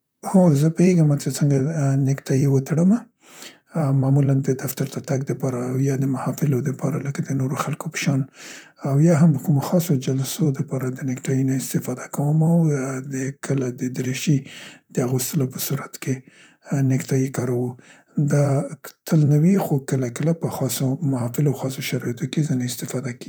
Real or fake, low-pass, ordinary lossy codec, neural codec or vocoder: fake; none; none; vocoder, 44.1 kHz, 128 mel bands, Pupu-Vocoder